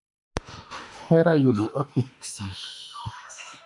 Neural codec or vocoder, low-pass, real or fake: autoencoder, 48 kHz, 32 numbers a frame, DAC-VAE, trained on Japanese speech; 10.8 kHz; fake